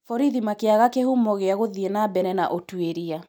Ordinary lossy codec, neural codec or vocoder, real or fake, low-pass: none; none; real; none